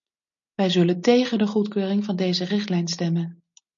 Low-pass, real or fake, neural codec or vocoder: 7.2 kHz; real; none